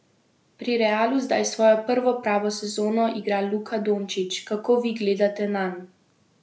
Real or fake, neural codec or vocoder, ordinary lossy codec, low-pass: real; none; none; none